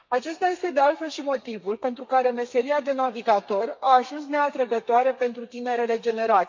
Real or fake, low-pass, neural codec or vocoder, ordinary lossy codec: fake; 7.2 kHz; codec, 32 kHz, 1.9 kbps, SNAC; MP3, 48 kbps